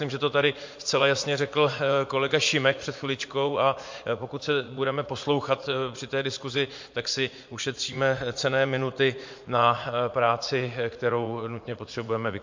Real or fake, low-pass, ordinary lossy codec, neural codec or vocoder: fake; 7.2 kHz; MP3, 48 kbps; vocoder, 44.1 kHz, 80 mel bands, Vocos